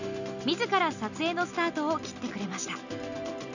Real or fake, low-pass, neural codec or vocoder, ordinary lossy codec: real; 7.2 kHz; none; none